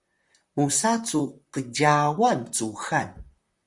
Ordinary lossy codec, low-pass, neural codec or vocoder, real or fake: Opus, 64 kbps; 10.8 kHz; vocoder, 44.1 kHz, 128 mel bands, Pupu-Vocoder; fake